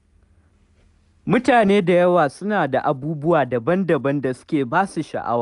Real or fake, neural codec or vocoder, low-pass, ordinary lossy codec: real; none; 10.8 kHz; none